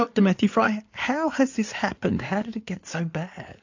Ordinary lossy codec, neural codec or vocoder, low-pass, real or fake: AAC, 48 kbps; codec, 16 kHz in and 24 kHz out, 2.2 kbps, FireRedTTS-2 codec; 7.2 kHz; fake